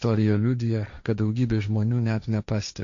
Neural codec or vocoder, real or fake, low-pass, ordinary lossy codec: codec, 16 kHz, 1.1 kbps, Voila-Tokenizer; fake; 7.2 kHz; MP3, 64 kbps